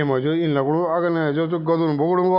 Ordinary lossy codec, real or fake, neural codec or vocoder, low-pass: none; real; none; 5.4 kHz